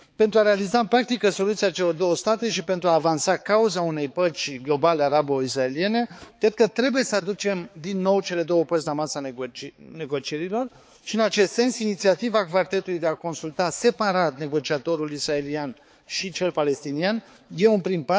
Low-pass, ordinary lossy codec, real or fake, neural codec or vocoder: none; none; fake; codec, 16 kHz, 4 kbps, X-Codec, HuBERT features, trained on balanced general audio